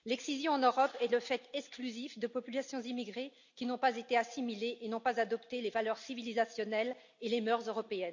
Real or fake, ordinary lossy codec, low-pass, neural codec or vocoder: real; none; 7.2 kHz; none